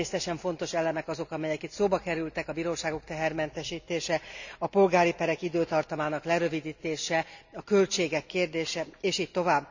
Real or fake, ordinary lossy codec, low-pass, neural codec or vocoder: real; none; 7.2 kHz; none